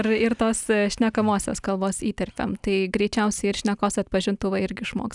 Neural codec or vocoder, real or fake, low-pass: none; real; 10.8 kHz